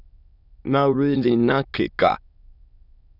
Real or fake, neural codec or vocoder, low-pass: fake; autoencoder, 22.05 kHz, a latent of 192 numbers a frame, VITS, trained on many speakers; 5.4 kHz